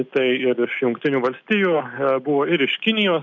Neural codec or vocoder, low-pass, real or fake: none; 7.2 kHz; real